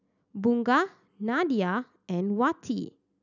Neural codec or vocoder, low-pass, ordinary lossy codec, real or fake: none; 7.2 kHz; none; real